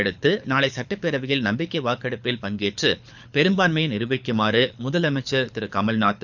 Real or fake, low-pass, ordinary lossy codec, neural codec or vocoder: fake; 7.2 kHz; none; codec, 24 kHz, 6 kbps, HILCodec